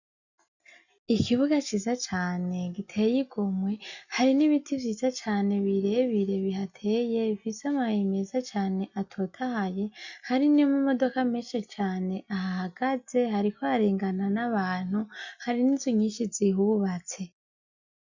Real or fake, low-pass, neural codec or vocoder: real; 7.2 kHz; none